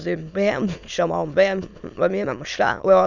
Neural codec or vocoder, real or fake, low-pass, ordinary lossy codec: autoencoder, 22.05 kHz, a latent of 192 numbers a frame, VITS, trained on many speakers; fake; 7.2 kHz; none